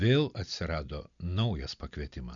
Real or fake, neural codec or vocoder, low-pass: real; none; 7.2 kHz